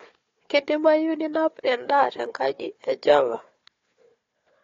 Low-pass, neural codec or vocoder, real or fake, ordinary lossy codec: 7.2 kHz; codec, 16 kHz, 4 kbps, FunCodec, trained on Chinese and English, 50 frames a second; fake; AAC, 32 kbps